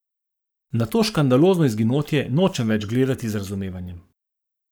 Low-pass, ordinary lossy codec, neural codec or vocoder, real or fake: none; none; codec, 44.1 kHz, 7.8 kbps, Pupu-Codec; fake